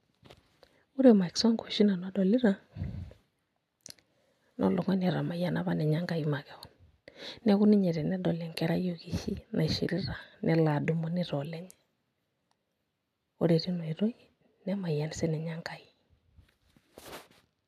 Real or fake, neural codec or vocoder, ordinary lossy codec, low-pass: real; none; none; 14.4 kHz